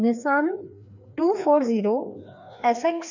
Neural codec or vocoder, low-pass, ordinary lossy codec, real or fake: codec, 16 kHz, 2 kbps, FreqCodec, larger model; 7.2 kHz; none; fake